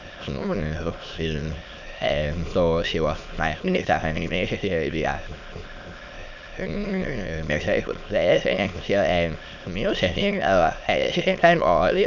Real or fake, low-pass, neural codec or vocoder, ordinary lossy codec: fake; 7.2 kHz; autoencoder, 22.05 kHz, a latent of 192 numbers a frame, VITS, trained on many speakers; none